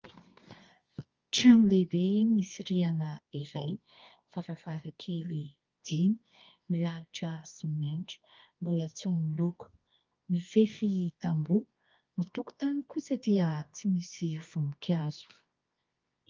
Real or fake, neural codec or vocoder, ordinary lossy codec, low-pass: fake; codec, 24 kHz, 0.9 kbps, WavTokenizer, medium music audio release; Opus, 24 kbps; 7.2 kHz